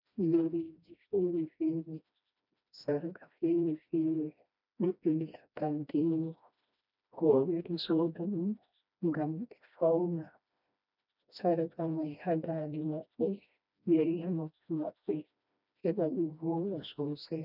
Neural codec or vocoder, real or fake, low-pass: codec, 16 kHz, 1 kbps, FreqCodec, smaller model; fake; 5.4 kHz